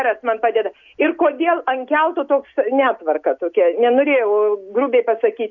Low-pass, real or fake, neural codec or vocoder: 7.2 kHz; real; none